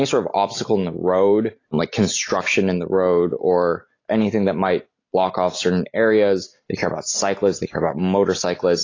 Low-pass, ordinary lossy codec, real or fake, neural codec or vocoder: 7.2 kHz; AAC, 32 kbps; real; none